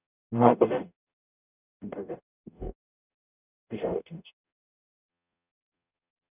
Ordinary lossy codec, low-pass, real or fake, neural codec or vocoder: none; 3.6 kHz; fake; codec, 44.1 kHz, 0.9 kbps, DAC